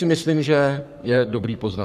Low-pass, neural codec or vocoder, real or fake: 14.4 kHz; codec, 44.1 kHz, 3.4 kbps, Pupu-Codec; fake